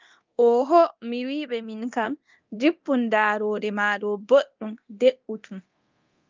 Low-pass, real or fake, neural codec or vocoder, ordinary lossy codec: 7.2 kHz; fake; codec, 24 kHz, 0.9 kbps, DualCodec; Opus, 24 kbps